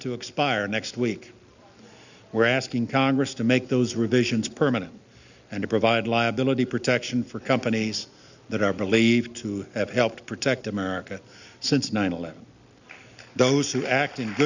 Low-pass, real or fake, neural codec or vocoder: 7.2 kHz; real; none